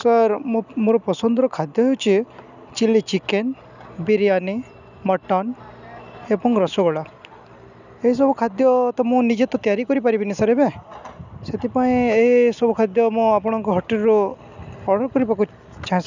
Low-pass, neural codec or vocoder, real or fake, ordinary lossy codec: 7.2 kHz; none; real; none